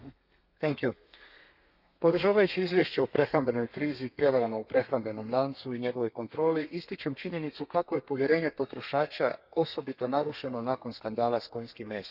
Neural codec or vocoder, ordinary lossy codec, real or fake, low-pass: codec, 32 kHz, 1.9 kbps, SNAC; none; fake; 5.4 kHz